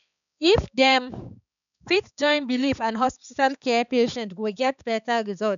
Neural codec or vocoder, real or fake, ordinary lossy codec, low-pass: codec, 16 kHz, 4 kbps, X-Codec, HuBERT features, trained on balanced general audio; fake; none; 7.2 kHz